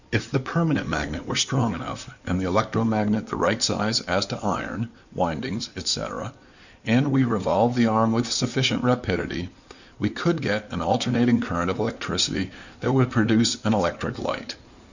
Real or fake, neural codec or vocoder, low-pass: fake; codec, 16 kHz in and 24 kHz out, 2.2 kbps, FireRedTTS-2 codec; 7.2 kHz